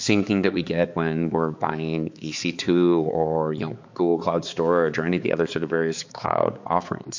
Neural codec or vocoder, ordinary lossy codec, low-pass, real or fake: codec, 16 kHz, 4 kbps, X-Codec, HuBERT features, trained on balanced general audio; MP3, 48 kbps; 7.2 kHz; fake